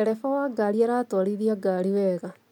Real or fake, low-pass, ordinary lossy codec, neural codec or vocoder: real; 19.8 kHz; MP3, 96 kbps; none